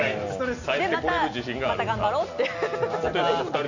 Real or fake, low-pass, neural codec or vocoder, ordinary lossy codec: real; 7.2 kHz; none; none